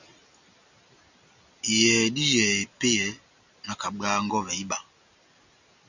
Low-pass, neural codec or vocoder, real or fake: 7.2 kHz; none; real